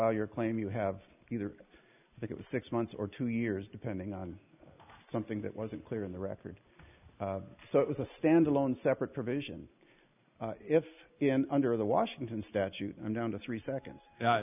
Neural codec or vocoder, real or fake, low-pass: none; real; 3.6 kHz